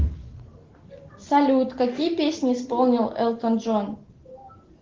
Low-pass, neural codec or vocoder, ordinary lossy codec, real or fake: 7.2 kHz; none; Opus, 16 kbps; real